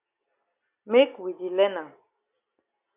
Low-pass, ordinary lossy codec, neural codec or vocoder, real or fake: 3.6 kHz; AAC, 32 kbps; none; real